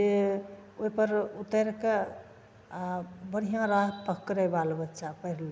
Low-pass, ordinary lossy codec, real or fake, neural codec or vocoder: none; none; real; none